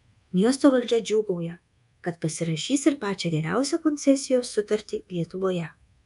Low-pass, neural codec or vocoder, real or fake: 10.8 kHz; codec, 24 kHz, 1.2 kbps, DualCodec; fake